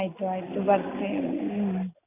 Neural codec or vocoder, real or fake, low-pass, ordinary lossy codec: none; real; 3.6 kHz; none